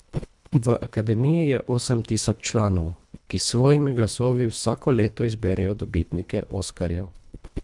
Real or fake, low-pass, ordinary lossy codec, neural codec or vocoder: fake; 10.8 kHz; MP3, 96 kbps; codec, 24 kHz, 1.5 kbps, HILCodec